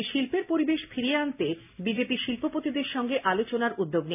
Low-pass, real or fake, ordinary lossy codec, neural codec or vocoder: 3.6 kHz; real; none; none